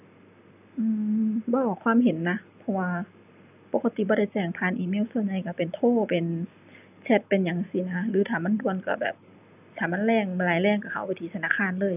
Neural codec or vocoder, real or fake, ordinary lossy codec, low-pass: none; real; none; 3.6 kHz